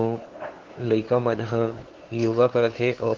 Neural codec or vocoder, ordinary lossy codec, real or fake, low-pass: codec, 16 kHz, 2 kbps, FunCodec, trained on LibriTTS, 25 frames a second; Opus, 16 kbps; fake; 7.2 kHz